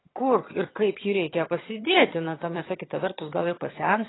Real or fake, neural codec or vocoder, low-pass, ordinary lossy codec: fake; vocoder, 22.05 kHz, 80 mel bands, HiFi-GAN; 7.2 kHz; AAC, 16 kbps